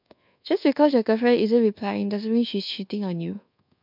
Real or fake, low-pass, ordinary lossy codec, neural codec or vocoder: fake; 5.4 kHz; MP3, 48 kbps; codec, 24 kHz, 1.2 kbps, DualCodec